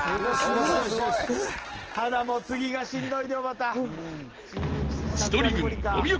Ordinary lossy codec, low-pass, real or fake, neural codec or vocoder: Opus, 16 kbps; 7.2 kHz; real; none